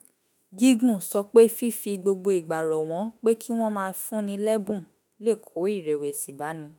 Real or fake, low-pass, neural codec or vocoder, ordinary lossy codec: fake; none; autoencoder, 48 kHz, 32 numbers a frame, DAC-VAE, trained on Japanese speech; none